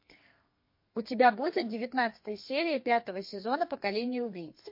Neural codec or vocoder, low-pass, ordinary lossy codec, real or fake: codec, 32 kHz, 1.9 kbps, SNAC; 5.4 kHz; MP3, 32 kbps; fake